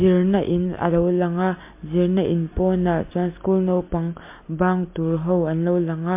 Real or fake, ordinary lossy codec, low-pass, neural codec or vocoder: real; MP3, 24 kbps; 3.6 kHz; none